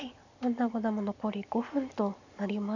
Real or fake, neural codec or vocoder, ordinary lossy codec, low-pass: real; none; none; 7.2 kHz